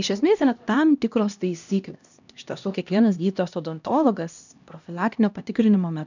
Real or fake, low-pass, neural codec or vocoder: fake; 7.2 kHz; codec, 16 kHz, 1 kbps, X-Codec, HuBERT features, trained on LibriSpeech